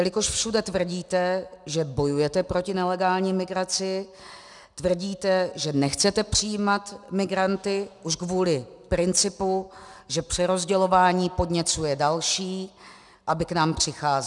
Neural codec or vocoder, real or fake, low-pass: none; real; 10.8 kHz